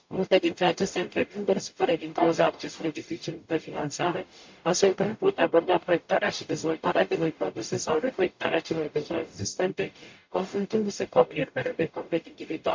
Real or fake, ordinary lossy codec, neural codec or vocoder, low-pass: fake; MP3, 48 kbps; codec, 44.1 kHz, 0.9 kbps, DAC; 7.2 kHz